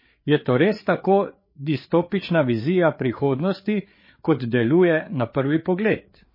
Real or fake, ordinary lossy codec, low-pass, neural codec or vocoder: fake; MP3, 24 kbps; 5.4 kHz; codec, 16 kHz, 4 kbps, FreqCodec, larger model